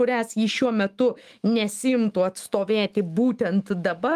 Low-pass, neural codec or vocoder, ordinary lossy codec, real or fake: 14.4 kHz; autoencoder, 48 kHz, 128 numbers a frame, DAC-VAE, trained on Japanese speech; Opus, 24 kbps; fake